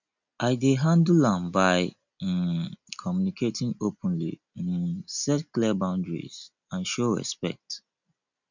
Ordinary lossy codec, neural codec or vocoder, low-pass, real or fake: none; none; 7.2 kHz; real